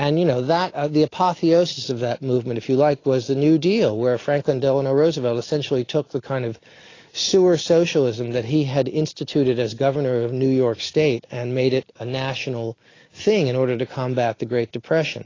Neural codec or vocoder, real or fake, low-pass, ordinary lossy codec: none; real; 7.2 kHz; AAC, 32 kbps